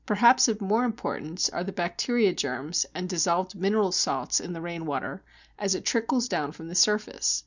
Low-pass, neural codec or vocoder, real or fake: 7.2 kHz; none; real